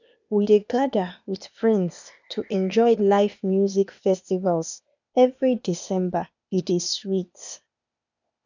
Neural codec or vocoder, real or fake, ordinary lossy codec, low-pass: codec, 16 kHz, 0.8 kbps, ZipCodec; fake; none; 7.2 kHz